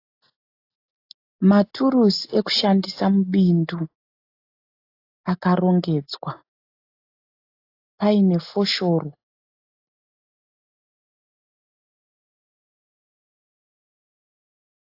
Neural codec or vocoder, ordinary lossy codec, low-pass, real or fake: none; AAC, 32 kbps; 5.4 kHz; real